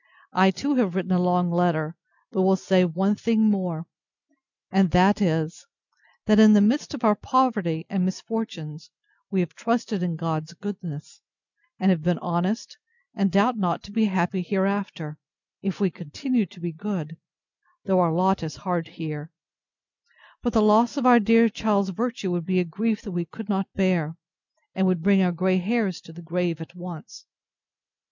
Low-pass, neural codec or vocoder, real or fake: 7.2 kHz; none; real